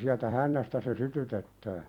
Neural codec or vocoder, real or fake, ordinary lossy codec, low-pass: vocoder, 44.1 kHz, 128 mel bands every 256 samples, BigVGAN v2; fake; none; 19.8 kHz